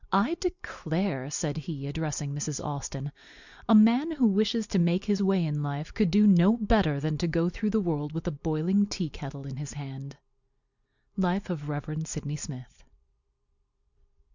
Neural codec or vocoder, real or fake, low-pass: none; real; 7.2 kHz